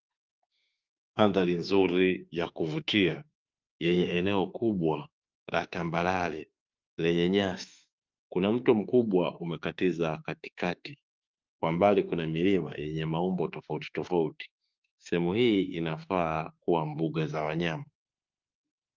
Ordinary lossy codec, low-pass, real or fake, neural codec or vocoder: Opus, 24 kbps; 7.2 kHz; fake; autoencoder, 48 kHz, 32 numbers a frame, DAC-VAE, trained on Japanese speech